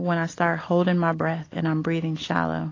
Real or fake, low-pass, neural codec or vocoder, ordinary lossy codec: real; 7.2 kHz; none; AAC, 32 kbps